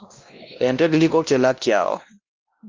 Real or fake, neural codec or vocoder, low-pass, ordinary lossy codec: fake; codec, 16 kHz, 1 kbps, X-Codec, WavLM features, trained on Multilingual LibriSpeech; 7.2 kHz; Opus, 32 kbps